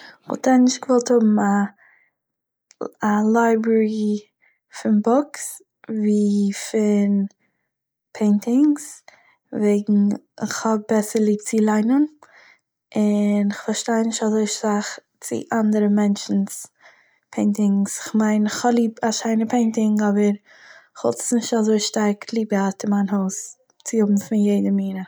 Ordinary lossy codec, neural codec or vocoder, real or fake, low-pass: none; none; real; none